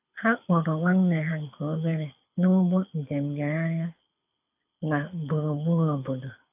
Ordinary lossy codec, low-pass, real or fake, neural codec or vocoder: AAC, 32 kbps; 3.6 kHz; fake; codec, 24 kHz, 6 kbps, HILCodec